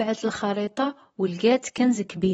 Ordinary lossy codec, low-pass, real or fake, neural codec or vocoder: AAC, 24 kbps; 19.8 kHz; fake; vocoder, 44.1 kHz, 128 mel bands, Pupu-Vocoder